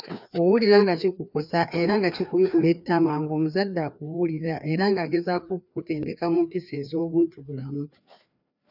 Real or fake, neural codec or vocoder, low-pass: fake; codec, 16 kHz, 2 kbps, FreqCodec, larger model; 5.4 kHz